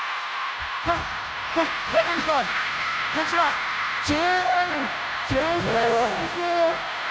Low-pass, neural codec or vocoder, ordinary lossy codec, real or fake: none; codec, 16 kHz, 0.5 kbps, FunCodec, trained on Chinese and English, 25 frames a second; none; fake